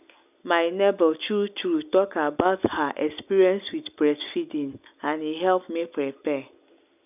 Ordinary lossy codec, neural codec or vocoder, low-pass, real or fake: none; none; 3.6 kHz; real